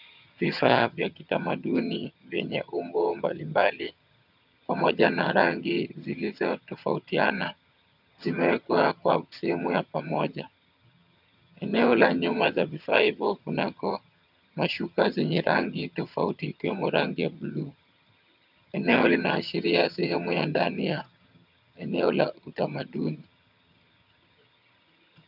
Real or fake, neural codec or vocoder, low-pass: fake; vocoder, 22.05 kHz, 80 mel bands, HiFi-GAN; 5.4 kHz